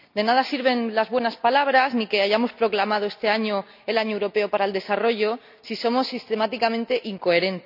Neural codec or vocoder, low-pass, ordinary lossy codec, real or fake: none; 5.4 kHz; none; real